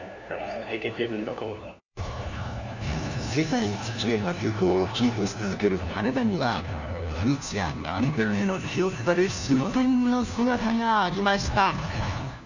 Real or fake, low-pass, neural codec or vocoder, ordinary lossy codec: fake; 7.2 kHz; codec, 16 kHz, 1 kbps, FunCodec, trained on LibriTTS, 50 frames a second; none